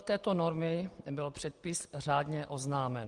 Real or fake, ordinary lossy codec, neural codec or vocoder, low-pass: real; Opus, 24 kbps; none; 10.8 kHz